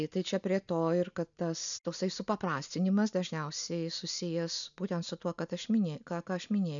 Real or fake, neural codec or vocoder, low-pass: real; none; 7.2 kHz